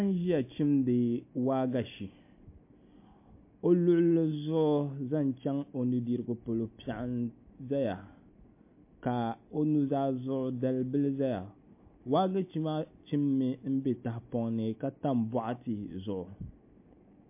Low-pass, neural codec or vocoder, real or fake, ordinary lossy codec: 3.6 kHz; none; real; MP3, 24 kbps